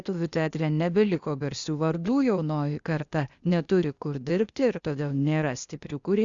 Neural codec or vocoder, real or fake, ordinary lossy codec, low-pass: codec, 16 kHz, 0.8 kbps, ZipCodec; fake; Opus, 64 kbps; 7.2 kHz